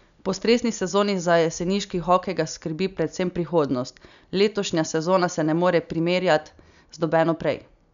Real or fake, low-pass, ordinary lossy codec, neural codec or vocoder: real; 7.2 kHz; none; none